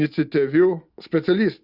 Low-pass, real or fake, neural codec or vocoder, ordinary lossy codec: 5.4 kHz; real; none; Opus, 64 kbps